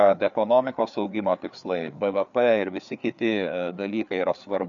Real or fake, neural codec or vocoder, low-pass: fake; codec, 16 kHz, 4 kbps, FreqCodec, larger model; 7.2 kHz